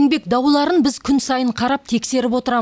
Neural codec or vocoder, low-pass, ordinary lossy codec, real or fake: none; none; none; real